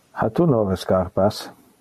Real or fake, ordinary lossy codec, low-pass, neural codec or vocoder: real; MP3, 64 kbps; 14.4 kHz; none